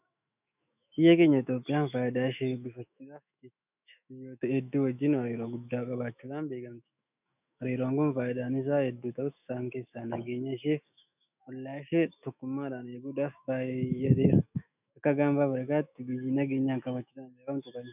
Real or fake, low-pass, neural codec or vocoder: fake; 3.6 kHz; autoencoder, 48 kHz, 128 numbers a frame, DAC-VAE, trained on Japanese speech